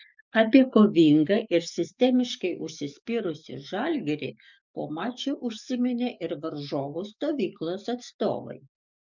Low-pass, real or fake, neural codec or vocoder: 7.2 kHz; fake; codec, 44.1 kHz, 7.8 kbps, DAC